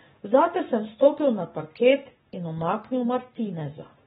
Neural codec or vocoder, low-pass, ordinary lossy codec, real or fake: codec, 44.1 kHz, 7.8 kbps, Pupu-Codec; 19.8 kHz; AAC, 16 kbps; fake